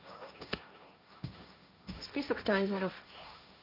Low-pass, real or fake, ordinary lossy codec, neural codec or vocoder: 5.4 kHz; fake; none; codec, 16 kHz, 1.1 kbps, Voila-Tokenizer